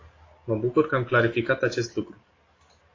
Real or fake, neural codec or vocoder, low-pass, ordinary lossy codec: real; none; 7.2 kHz; AAC, 32 kbps